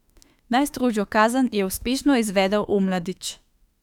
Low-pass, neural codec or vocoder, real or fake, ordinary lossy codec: 19.8 kHz; autoencoder, 48 kHz, 32 numbers a frame, DAC-VAE, trained on Japanese speech; fake; none